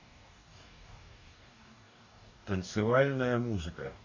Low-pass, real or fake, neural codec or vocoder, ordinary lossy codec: 7.2 kHz; fake; codec, 44.1 kHz, 2.6 kbps, DAC; none